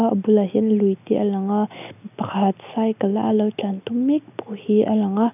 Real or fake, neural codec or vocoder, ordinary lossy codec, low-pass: real; none; none; 3.6 kHz